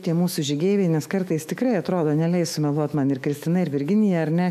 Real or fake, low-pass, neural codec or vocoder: fake; 14.4 kHz; autoencoder, 48 kHz, 128 numbers a frame, DAC-VAE, trained on Japanese speech